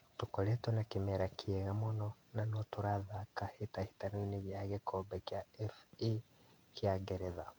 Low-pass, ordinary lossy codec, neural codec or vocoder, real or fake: 19.8 kHz; MP3, 96 kbps; vocoder, 48 kHz, 128 mel bands, Vocos; fake